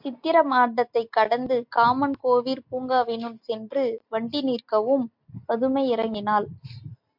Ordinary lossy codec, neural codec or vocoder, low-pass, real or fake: MP3, 48 kbps; none; 5.4 kHz; real